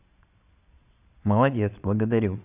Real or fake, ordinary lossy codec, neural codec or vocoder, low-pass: fake; none; vocoder, 22.05 kHz, 80 mel bands, Vocos; 3.6 kHz